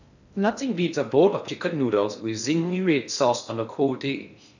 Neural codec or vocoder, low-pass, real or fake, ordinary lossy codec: codec, 16 kHz in and 24 kHz out, 0.6 kbps, FocalCodec, streaming, 2048 codes; 7.2 kHz; fake; none